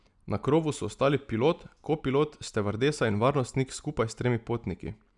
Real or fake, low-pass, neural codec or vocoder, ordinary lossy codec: real; 10.8 kHz; none; none